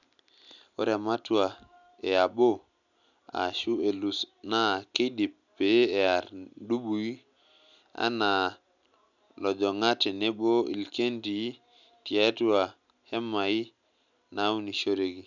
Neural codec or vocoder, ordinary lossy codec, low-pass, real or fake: none; none; 7.2 kHz; real